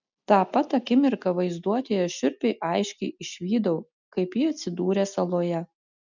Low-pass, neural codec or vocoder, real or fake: 7.2 kHz; none; real